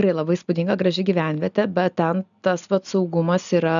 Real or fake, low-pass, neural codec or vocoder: real; 7.2 kHz; none